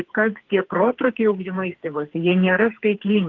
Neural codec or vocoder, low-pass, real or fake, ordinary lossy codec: codec, 44.1 kHz, 2.6 kbps, SNAC; 7.2 kHz; fake; Opus, 16 kbps